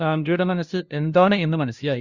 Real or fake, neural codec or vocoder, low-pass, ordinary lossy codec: fake; codec, 16 kHz, 0.5 kbps, FunCodec, trained on LibriTTS, 25 frames a second; 7.2 kHz; Opus, 64 kbps